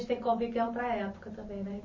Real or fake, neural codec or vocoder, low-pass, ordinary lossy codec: fake; vocoder, 44.1 kHz, 128 mel bands every 512 samples, BigVGAN v2; 7.2 kHz; MP3, 32 kbps